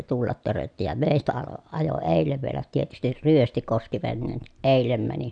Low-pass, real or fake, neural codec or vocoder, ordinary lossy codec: 9.9 kHz; real; none; none